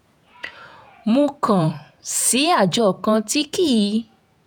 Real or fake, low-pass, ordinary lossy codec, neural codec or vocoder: fake; none; none; vocoder, 48 kHz, 128 mel bands, Vocos